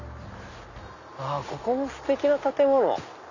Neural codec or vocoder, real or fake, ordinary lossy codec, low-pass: none; real; none; 7.2 kHz